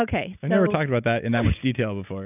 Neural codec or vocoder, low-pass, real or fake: none; 3.6 kHz; real